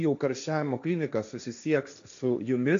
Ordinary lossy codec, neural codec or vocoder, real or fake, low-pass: AAC, 64 kbps; codec, 16 kHz, 1.1 kbps, Voila-Tokenizer; fake; 7.2 kHz